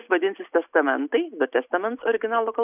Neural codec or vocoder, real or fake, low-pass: none; real; 3.6 kHz